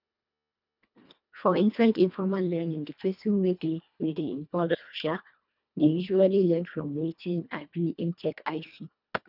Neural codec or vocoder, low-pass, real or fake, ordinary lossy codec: codec, 24 kHz, 1.5 kbps, HILCodec; 5.4 kHz; fake; none